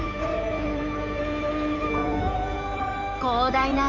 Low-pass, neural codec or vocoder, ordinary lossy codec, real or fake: 7.2 kHz; codec, 16 kHz in and 24 kHz out, 2.2 kbps, FireRedTTS-2 codec; none; fake